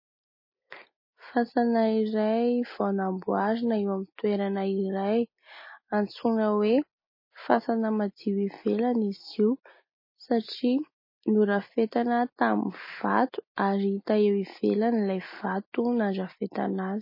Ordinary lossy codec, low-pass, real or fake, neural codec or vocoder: MP3, 24 kbps; 5.4 kHz; real; none